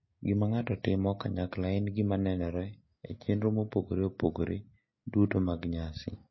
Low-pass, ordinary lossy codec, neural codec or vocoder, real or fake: 7.2 kHz; MP3, 24 kbps; none; real